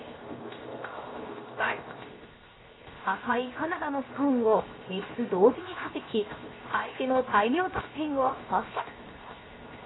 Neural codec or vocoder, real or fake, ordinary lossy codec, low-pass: codec, 16 kHz, 0.7 kbps, FocalCodec; fake; AAC, 16 kbps; 7.2 kHz